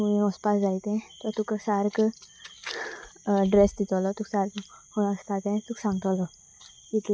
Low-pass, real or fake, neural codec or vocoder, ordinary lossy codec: none; real; none; none